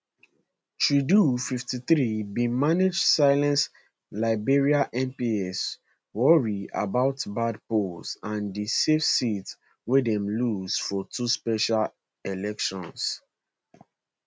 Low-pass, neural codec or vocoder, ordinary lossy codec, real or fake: none; none; none; real